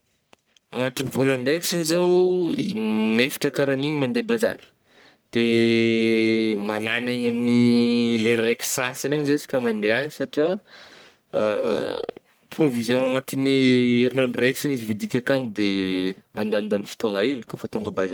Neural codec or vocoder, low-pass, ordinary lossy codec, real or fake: codec, 44.1 kHz, 1.7 kbps, Pupu-Codec; none; none; fake